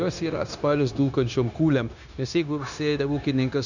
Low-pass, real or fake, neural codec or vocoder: 7.2 kHz; fake; codec, 16 kHz, 0.9 kbps, LongCat-Audio-Codec